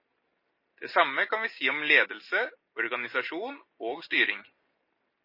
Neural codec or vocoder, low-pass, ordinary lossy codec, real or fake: none; 5.4 kHz; MP3, 24 kbps; real